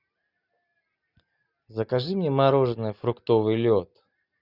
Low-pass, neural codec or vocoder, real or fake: 5.4 kHz; none; real